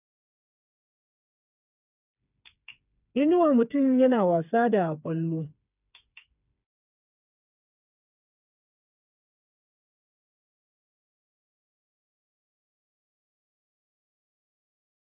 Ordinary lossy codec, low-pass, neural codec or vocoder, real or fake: none; 3.6 kHz; codec, 16 kHz, 4 kbps, FreqCodec, smaller model; fake